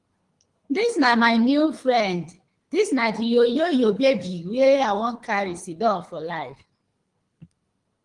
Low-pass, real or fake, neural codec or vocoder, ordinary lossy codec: 10.8 kHz; fake; codec, 24 kHz, 3 kbps, HILCodec; Opus, 24 kbps